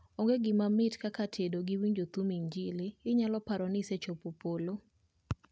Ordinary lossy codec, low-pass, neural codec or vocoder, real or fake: none; none; none; real